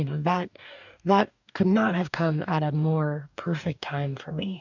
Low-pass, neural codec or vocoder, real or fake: 7.2 kHz; codec, 44.1 kHz, 2.6 kbps, DAC; fake